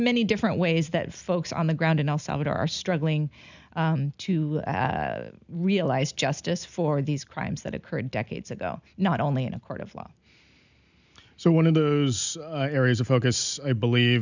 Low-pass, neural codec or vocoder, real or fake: 7.2 kHz; none; real